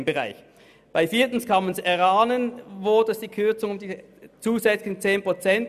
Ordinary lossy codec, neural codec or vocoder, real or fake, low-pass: none; none; real; 14.4 kHz